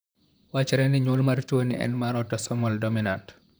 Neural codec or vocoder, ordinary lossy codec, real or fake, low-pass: vocoder, 44.1 kHz, 128 mel bands, Pupu-Vocoder; none; fake; none